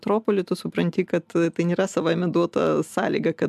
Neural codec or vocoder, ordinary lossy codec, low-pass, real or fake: none; MP3, 96 kbps; 14.4 kHz; real